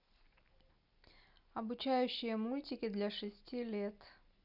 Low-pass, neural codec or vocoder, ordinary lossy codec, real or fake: 5.4 kHz; none; none; real